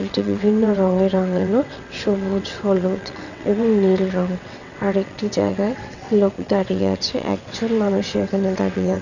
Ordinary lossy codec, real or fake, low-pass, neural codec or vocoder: none; fake; 7.2 kHz; vocoder, 22.05 kHz, 80 mel bands, WaveNeXt